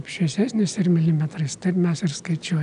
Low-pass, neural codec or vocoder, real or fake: 9.9 kHz; none; real